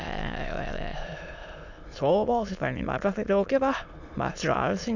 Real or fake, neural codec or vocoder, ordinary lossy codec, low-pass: fake; autoencoder, 22.05 kHz, a latent of 192 numbers a frame, VITS, trained on many speakers; none; 7.2 kHz